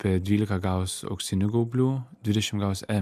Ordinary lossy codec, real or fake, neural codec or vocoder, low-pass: MP3, 96 kbps; real; none; 14.4 kHz